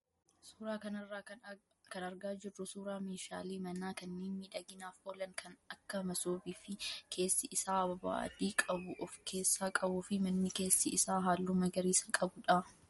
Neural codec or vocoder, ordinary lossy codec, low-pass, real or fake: none; MP3, 48 kbps; 19.8 kHz; real